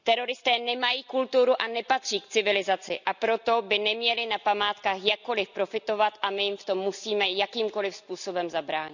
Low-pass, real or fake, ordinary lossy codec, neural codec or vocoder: 7.2 kHz; real; none; none